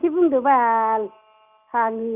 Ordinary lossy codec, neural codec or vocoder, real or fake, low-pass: none; none; real; 3.6 kHz